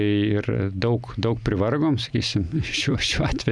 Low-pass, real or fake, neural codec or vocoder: 9.9 kHz; real; none